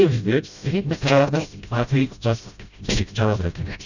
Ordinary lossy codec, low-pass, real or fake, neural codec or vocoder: none; 7.2 kHz; fake; codec, 16 kHz, 0.5 kbps, FreqCodec, smaller model